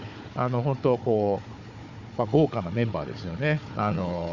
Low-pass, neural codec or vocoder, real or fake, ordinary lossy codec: 7.2 kHz; codec, 16 kHz, 4 kbps, FunCodec, trained on Chinese and English, 50 frames a second; fake; none